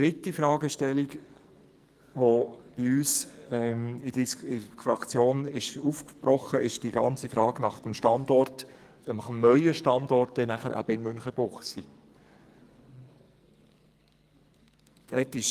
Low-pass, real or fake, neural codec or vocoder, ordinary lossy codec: 14.4 kHz; fake; codec, 44.1 kHz, 2.6 kbps, SNAC; Opus, 32 kbps